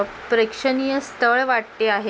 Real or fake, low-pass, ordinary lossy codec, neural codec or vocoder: real; none; none; none